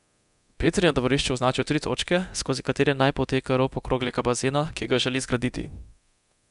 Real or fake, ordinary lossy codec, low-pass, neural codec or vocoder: fake; none; 10.8 kHz; codec, 24 kHz, 0.9 kbps, DualCodec